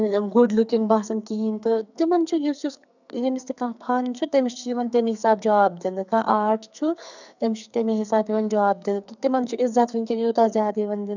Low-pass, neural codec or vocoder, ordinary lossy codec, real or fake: 7.2 kHz; codec, 44.1 kHz, 2.6 kbps, SNAC; none; fake